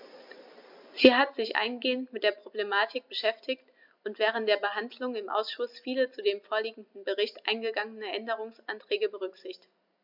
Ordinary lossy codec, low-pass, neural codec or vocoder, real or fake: MP3, 48 kbps; 5.4 kHz; none; real